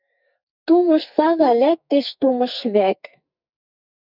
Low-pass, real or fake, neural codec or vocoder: 5.4 kHz; fake; codec, 44.1 kHz, 2.6 kbps, SNAC